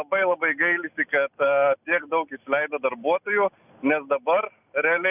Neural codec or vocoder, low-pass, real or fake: none; 3.6 kHz; real